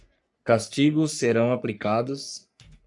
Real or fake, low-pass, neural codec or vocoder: fake; 10.8 kHz; codec, 44.1 kHz, 3.4 kbps, Pupu-Codec